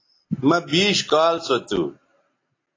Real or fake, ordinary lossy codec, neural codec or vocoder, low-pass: real; AAC, 32 kbps; none; 7.2 kHz